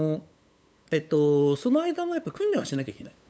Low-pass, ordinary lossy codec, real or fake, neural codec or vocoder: none; none; fake; codec, 16 kHz, 8 kbps, FunCodec, trained on LibriTTS, 25 frames a second